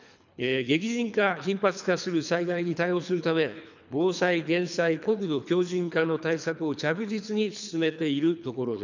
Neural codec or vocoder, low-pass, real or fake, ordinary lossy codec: codec, 24 kHz, 3 kbps, HILCodec; 7.2 kHz; fake; none